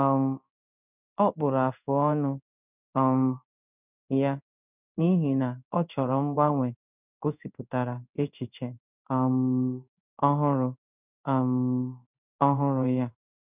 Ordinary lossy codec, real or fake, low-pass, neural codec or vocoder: none; fake; 3.6 kHz; codec, 16 kHz in and 24 kHz out, 1 kbps, XY-Tokenizer